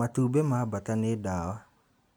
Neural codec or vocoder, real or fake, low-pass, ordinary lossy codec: none; real; none; none